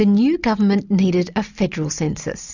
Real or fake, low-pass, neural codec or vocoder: real; 7.2 kHz; none